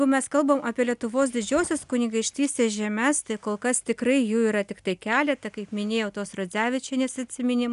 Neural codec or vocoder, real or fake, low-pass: none; real; 10.8 kHz